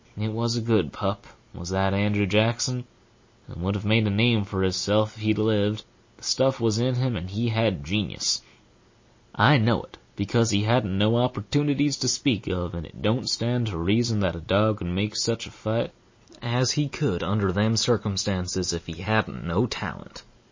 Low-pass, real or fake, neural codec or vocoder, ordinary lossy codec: 7.2 kHz; real; none; MP3, 32 kbps